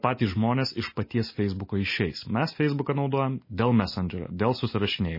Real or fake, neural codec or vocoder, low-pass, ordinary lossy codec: real; none; 5.4 kHz; MP3, 24 kbps